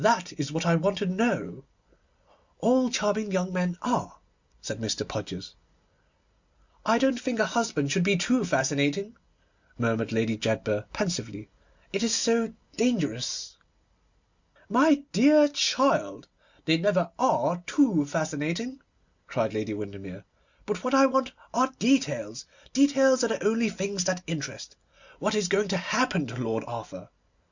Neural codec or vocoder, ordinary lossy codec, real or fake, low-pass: none; Opus, 64 kbps; real; 7.2 kHz